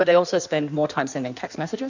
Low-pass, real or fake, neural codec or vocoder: 7.2 kHz; fake; codec, 16 kHz in and 24 kHz out, 1.1 kbps, FireRedTTS-2 codec